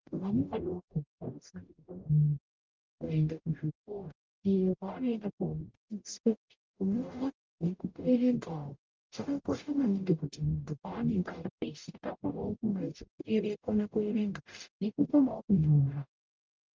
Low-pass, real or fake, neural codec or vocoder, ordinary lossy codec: 7.2 kHz; fake; codec, 44.1 kHz, 0.9 kbps, DAC; Opus, 24 kbps